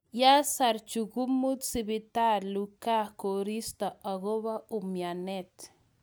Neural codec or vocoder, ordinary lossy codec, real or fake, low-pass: none; none; real; none